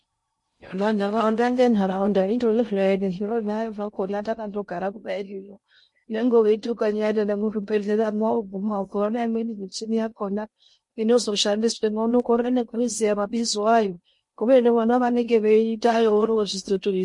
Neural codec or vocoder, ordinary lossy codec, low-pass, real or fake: codec, 16 kHz in and 24 kHz out, 0.6 kbps, FocalCodec, streaming, 2048 codes; MP3, 48 kbps; 10.8 kHz; fake